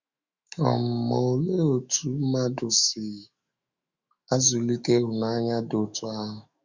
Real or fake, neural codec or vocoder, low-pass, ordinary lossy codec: fake; autoencoder, 48 kHz, 128 numbers a frame, DAC-VAE, trained on Japanese speech; 7.2 kHz; Opus, 64 kbps